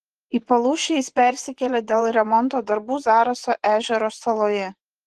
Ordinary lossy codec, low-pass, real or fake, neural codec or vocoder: Opus, 16 kbps; 10.8 kHz; fake; vocoder, 24 kHz, 100 mel bands, Vocos